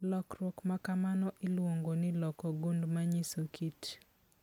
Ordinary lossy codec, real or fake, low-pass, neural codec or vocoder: none; real; 19.8 kHz; none